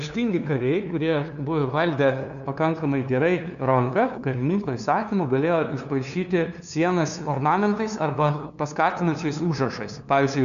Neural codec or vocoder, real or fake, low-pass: codec, 16 kHz, 2 kbps, FunCodec, trained on LibriTTS, 25 frames a second; fake; 7.2 kHz